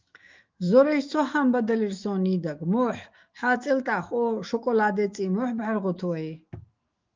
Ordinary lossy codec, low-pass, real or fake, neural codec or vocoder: Opus, 32 kbps; 7.2 kHz; fake; autoencoder, 48 kHz, 128 numbers a frame, DAC-VAE, trained on Japanese speech